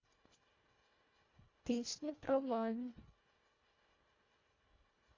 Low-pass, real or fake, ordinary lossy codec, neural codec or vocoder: 7.2 kHz; fake; AAC, 32 kbps; codec, 24 kHz, 1.5 kbps, HILCodec